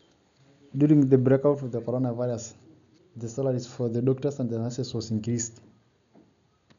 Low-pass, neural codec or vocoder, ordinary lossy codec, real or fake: 7.2 kHz; none; none; real